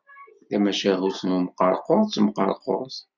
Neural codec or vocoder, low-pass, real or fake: none; 7.2 kHz; real